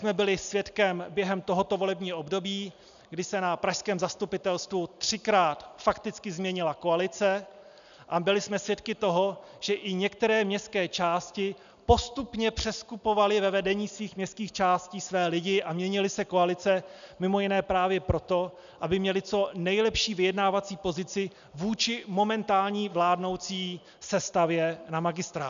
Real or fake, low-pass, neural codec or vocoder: real; 7.2 kHz; none